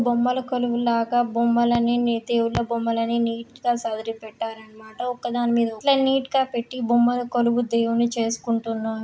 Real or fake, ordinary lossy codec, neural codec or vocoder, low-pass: real; none; none; none